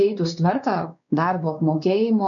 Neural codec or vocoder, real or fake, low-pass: codec, 16 kHz, 2 kbps, X-Codec, WavLM features, trained on Multilingual LibriSpeech; fake; 7.2 kHz